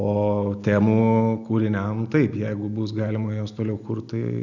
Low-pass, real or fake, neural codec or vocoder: 7.2 kHz; real; none